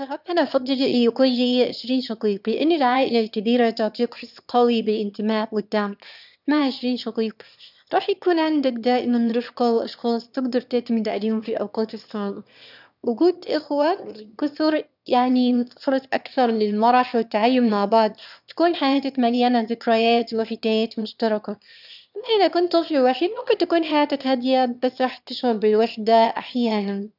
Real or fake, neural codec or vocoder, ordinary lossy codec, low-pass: fake; autoencoder, 22.05 kHz, a latent of 192 numbers a frame, VITS, trained on one speaker; none; 5.4 kHz